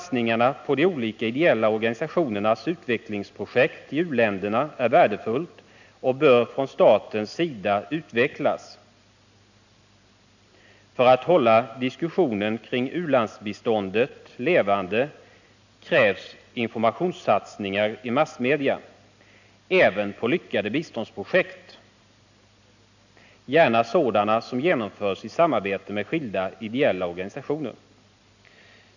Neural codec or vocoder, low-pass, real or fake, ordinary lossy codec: none; 7.2 kHz; real; none